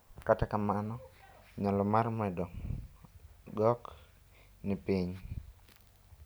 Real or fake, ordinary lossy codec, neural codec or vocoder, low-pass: real; none; none; none